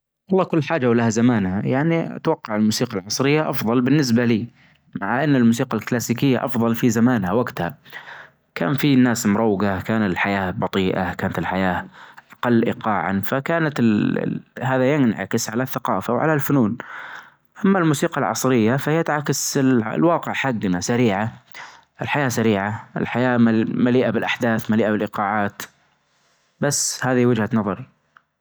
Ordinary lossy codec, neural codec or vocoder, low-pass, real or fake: none; none; none; real